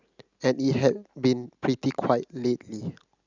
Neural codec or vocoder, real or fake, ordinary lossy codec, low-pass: none; real; Opus, 64 kbps; 7.2 kHz